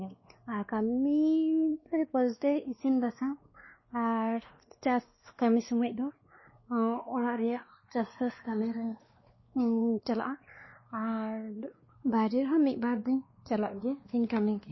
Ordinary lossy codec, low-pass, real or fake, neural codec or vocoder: MP3, 24 kbps; 7.2 kHz; fake; codec, 16 kHz, 2 kbps, X-Codec, WavLM features, trained on Multilingual LibriSpeech